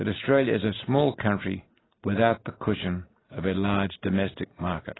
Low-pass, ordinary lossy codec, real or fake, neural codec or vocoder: 7.2 kHz; AAC, 16 kbps; real; none